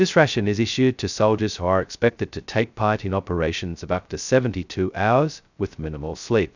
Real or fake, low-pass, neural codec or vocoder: fake; 7.2 kHz; codec, 16 kHz, 0.2 kbps, FocalCodec